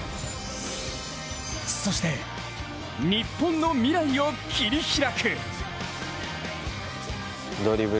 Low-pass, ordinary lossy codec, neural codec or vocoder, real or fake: none; none; none; real